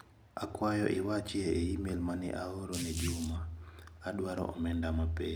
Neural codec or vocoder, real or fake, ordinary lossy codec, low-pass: none; real; none; none